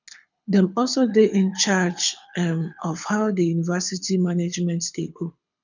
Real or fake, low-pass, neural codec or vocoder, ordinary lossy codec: fake; 7.2 kHz; codec, 24 kHz, 6 kbps, HILCodec; none